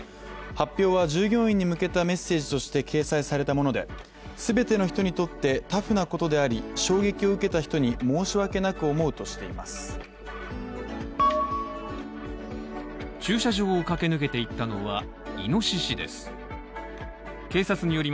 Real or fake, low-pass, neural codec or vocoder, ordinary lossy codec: real; none; none; none